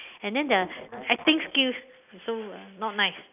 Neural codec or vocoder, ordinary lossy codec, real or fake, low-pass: none; none; real; 3.6 kHz